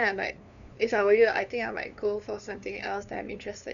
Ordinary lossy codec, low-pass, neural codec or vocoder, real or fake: none; 7.2 kHz; codec, 16 kHz, 2 kbps, FunCodec, trained on LibriTTS, 25 frames a second; fake